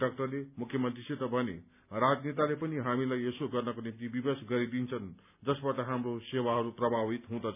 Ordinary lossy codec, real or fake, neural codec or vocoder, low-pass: none; real; none; 3.6 kHz